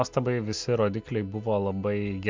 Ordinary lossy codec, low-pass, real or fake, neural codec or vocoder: MP3, 64 kbps; 7.2 kHz; real; none